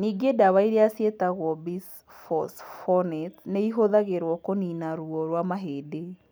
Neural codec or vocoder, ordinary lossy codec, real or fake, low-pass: none; none; real; none